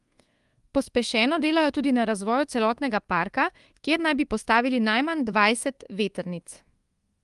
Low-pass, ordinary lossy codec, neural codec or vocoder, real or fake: 10.8 kHz; Opus, 24 kbps; codec, 24 kHz, 1.2 kbps, DualCodec; fake